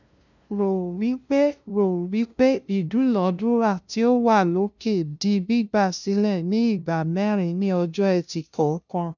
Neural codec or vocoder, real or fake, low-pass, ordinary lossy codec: codec, 16 kHz, 0.5 kbps, FunCodec, trained on LibriTTS, 25 frames a second; fake; 7.2 kHz; none